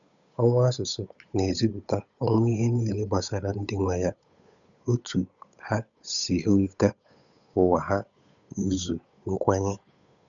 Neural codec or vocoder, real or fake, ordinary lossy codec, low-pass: codec, 16 kHz, 8 kbps, FunCodec, trained on Chinese and English, 25 frames a second; fake; none; 7.2 kHz